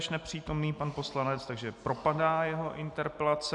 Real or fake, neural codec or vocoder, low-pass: real; none; 10.8 kHz